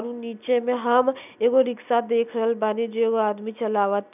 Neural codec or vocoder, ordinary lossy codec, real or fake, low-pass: none; none; real; 3.6 kHz